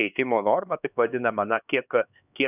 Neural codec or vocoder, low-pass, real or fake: codec, 16 kHz, 2 kbps, X-Codec, HuBERT features, trained on LibriSpeech; 3.6 kHz; fake